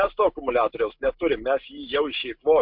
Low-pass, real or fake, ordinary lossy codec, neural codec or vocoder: 5.4 kHz; real; MP3, 48 kbps; none